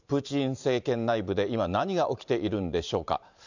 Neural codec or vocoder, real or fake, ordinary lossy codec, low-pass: vocoder, 44.1 kHz, 128 mel bands every 512 samples, BigVGAN v2; fake; none; 7.2 kHz